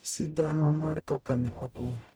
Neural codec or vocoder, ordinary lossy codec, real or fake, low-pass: codec, 44.1 kHz, 0.9 kbps, DAC; none; fake; none